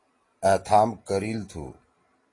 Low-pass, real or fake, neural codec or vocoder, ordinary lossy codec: 10.8 kHz; real; none; AAC, 32 kbps